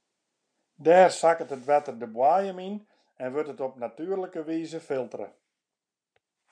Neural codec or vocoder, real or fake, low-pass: none; real; 9.9 kHz